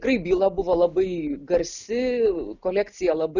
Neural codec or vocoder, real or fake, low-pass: none; real; 7.2 kHz